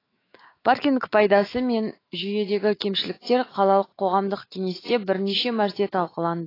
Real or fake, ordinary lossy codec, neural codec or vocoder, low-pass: fake; AAC, 24 kbps; autoencoder, 48 kHz, 128 numbers a frame, DAC-VAE, trained on Japanese speech; 5.4 kHz